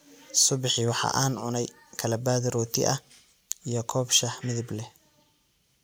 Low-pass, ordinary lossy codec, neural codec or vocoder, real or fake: none; none; none; real